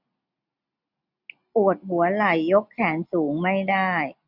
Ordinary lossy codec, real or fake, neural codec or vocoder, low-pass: none; real; none; 5.4 kHz